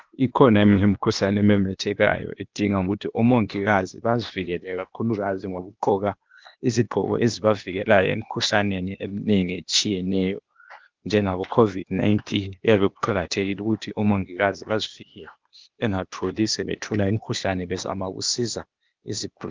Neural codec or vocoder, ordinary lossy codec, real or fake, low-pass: codec, 16 kHz, 0.8 kbps, ZipCodec; Opus, 24 kbps; fake; 7.2 kHz